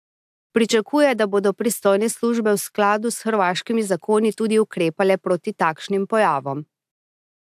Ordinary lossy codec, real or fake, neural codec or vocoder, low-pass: AAC, 96 kbps; real; none; 14.4 kHz